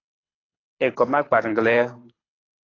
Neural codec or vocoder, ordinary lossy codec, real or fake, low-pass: codec, 24 kHz, 6 kbps, HILCodec; AAC, 32 kbps; fake; 7.2 kHz